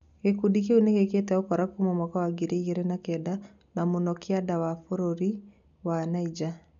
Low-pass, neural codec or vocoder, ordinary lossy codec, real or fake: 7.2 kHz; none; none; real